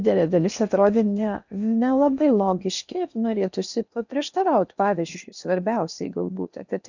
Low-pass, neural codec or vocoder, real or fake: 7.2 kHz; codec, 16 kHz in and 24 kHz out, 0.8 kbps, FocalCodec, streaming, 65536 codes; fake